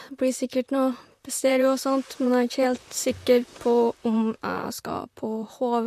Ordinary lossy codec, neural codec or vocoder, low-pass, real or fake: MP3, 64 kbps; vocoder, 44.1 kHz, 128 mel bands, Pupu-Vocoder; 14.4 kHz; fake